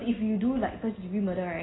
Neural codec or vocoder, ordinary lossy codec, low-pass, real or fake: none; AAC, 16 kbps; 7.2 kHz; real